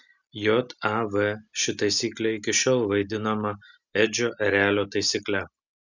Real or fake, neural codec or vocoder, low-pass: real; none; 7.2 kHz